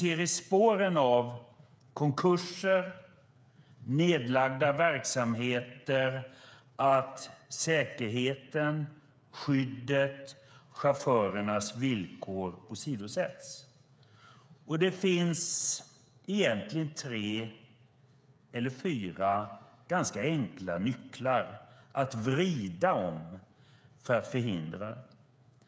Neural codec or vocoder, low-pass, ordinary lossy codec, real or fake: codec, 16 kHz, 8 kbps, FreqCodec, smaller model; none; none; fake